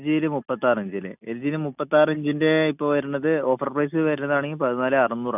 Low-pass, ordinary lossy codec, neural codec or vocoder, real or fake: 3.6 kHz; none; none; real